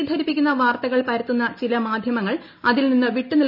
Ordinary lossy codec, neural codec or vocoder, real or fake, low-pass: none; none; real; 5.4 kHz